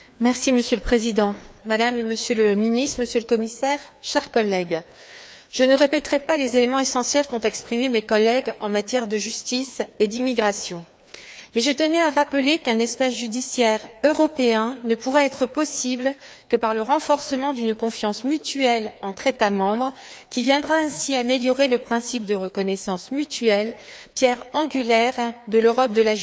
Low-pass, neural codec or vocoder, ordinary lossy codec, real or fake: none; codec, 16 kHz, 2 kbps, FreqCodec, larger model; none; fake